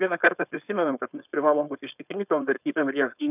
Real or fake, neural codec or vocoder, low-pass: fake; codec, 16 kHz, 4.8 kbps, FACodec; 3.6 kHz